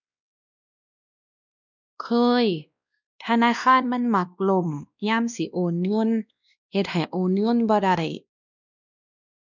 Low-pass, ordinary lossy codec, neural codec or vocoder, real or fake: 7.2 kHz; none; codec, 16 kHz, 1 kbps, X-Codec, WavLM features, trained on Multilingual LibriSpeech; fake